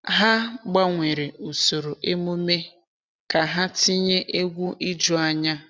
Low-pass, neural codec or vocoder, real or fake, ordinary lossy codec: none; none; real; none